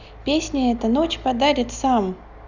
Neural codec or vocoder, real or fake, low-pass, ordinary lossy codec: none; real; 7.2 kHz; none